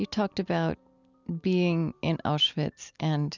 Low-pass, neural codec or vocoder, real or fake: 7.2 kHz; none; real